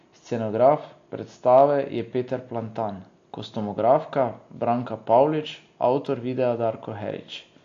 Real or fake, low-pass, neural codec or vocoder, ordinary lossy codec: real; 7.2 kHz; none; AAC, 48 kbps